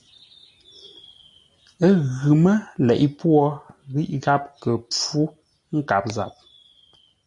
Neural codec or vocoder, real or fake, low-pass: none; real; 10.8 kHz